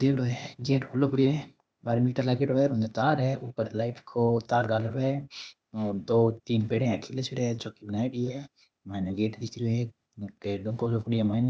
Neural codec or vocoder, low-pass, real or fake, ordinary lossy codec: codec, 16 kHz, 0.8 kbps, ZipCodec; none; fake; none